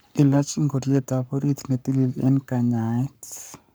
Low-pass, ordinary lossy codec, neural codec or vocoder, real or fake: none; none; codec, 44.1 kHz, 7.8 kbps, Pupu-Codec; fake